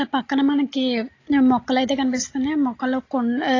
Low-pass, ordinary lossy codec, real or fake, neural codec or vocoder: 7.2 kHz; AAC, 32 kbps; real; none